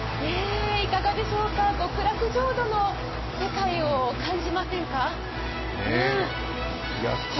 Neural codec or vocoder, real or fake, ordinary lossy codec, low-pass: none; real; MP3, 24 kbps; 7.2 kHz